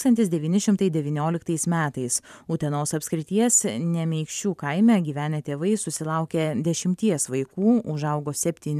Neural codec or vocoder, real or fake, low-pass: vocoder, 44.1 kHz, 128 mel bands every 512 samples, BigVGAN v2; fake; 14.4 kHz